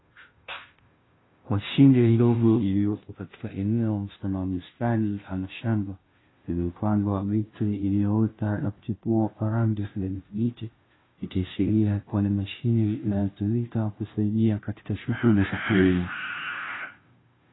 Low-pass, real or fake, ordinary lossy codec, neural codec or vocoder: 7.2 kHz; fake; AAC, 16 kbps; codec, 16 kHz, 0.5 kbps, FunCodec, trained on Chinese and English, 25 frames a second